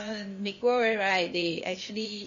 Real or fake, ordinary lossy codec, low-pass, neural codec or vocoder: fake; MP3, 32 kbps; 7.2 kHz; codec, 16 kHz, 0.8 kbps, ZipCodec